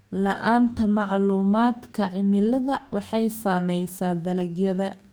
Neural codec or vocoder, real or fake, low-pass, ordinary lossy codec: codec, 44.1 kHz, 2.6 kbps, DAC; fake; none; none